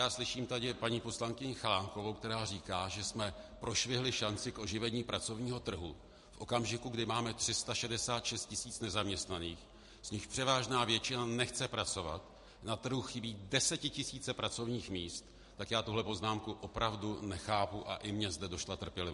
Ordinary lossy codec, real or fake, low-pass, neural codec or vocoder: MP3, 48 kbps; real; 14.4 kHz; none